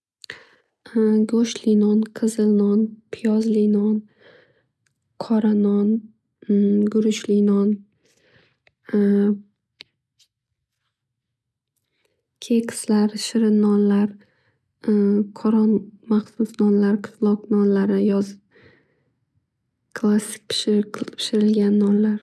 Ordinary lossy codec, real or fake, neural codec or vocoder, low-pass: none; real; none; none